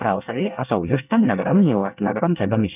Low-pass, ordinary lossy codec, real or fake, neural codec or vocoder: 3.6 kHz; none; fake; codec, 24 kHz, 1 kbps, SNAC